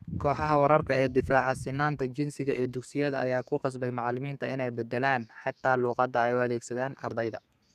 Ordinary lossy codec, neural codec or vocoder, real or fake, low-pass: none; codec, 32 kHz, 1.9 kbps, SNAC; fake; 14.4 kHz